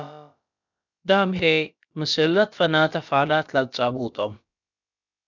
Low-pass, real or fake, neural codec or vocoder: 7.2 kHz; fake; codec, 16 kHz, about 1 kbps, DyCAST, with the encoder's durations